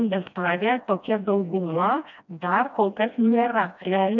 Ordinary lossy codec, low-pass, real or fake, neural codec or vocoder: MP3, 64 kbps; 7.2 kHz; fake; codec, 16 kHz, 1 kbps, FreqCodec, smaller model